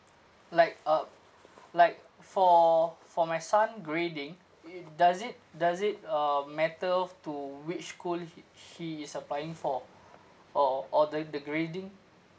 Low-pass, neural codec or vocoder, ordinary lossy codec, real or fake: none; none; none; real